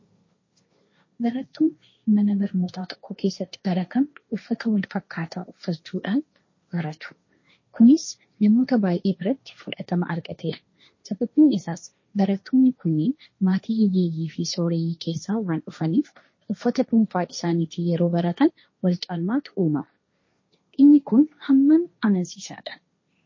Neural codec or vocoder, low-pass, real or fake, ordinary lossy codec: codec, 16 kHz, 1.1 kbps, Voila-Tokenizer; 7.2 kHz; fake; MP3, 32 kbps